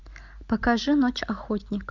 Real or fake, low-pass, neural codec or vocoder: real; 7.2 kHz; none